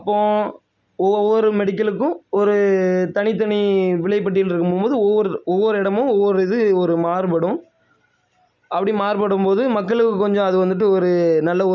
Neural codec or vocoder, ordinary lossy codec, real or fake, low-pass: none; none; real; 7.2 kHz